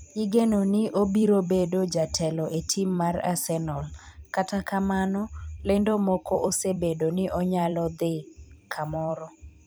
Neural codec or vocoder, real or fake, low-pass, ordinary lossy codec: vocoder, 44.1 kHz, 128 mel bands every 256 samples, BigVGAN v2; fake; none; none